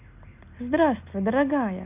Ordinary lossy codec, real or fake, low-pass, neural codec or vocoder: none; real; 3.6 kHz; none